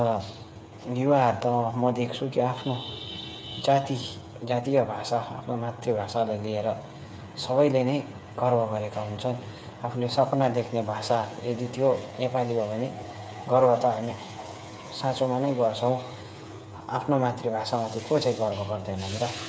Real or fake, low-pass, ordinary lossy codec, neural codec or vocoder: fake; none; none; codec, 16 kHz, 8 kbps, FreqCodec, smaller model